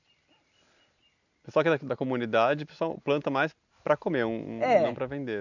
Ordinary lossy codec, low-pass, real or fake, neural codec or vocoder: none; 7.2 kHz; real; none